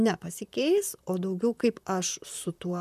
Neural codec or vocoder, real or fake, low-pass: vocoder, 44.1 kHz, 128 mel bands, Pupu-Vocoder; fake; 14.4 kHz